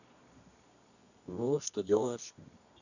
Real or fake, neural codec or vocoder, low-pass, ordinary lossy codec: fake; codec, 24 kHz, 0.9 kbps, WavTokenizer, medium music audio release; 7.2 kHz; none